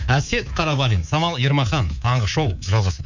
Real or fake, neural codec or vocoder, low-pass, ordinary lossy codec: fake; codec, 24 kHz, 3.1 kbps, DualCodec; 7.2 kHz; none